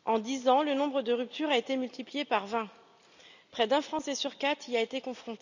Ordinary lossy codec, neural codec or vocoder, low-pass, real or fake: none; none; 7.2 kHz; real